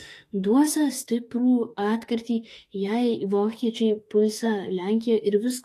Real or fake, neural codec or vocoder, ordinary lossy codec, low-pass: fake; autoencoder, 48 kHz, 32 numbers a frame, DAC-VAE, trained on Japanese speech; AAC, 48 kbps; 14.4 kHz